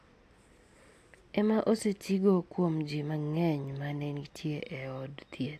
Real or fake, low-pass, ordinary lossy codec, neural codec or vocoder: real; 14.4 kHz; AAC, 64 kbps; none